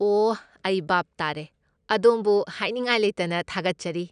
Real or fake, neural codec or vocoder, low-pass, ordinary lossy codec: real; none; 9.9 kHz; none